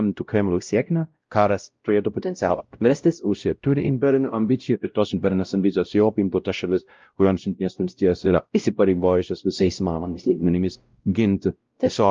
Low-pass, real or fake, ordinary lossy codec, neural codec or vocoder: 7.2 kHz; fake; Opus, 24 kbps; codec, 16 kHz, 0.5 kbps, X-Codec, WavLM features, trained on Multilingual LibriSpeech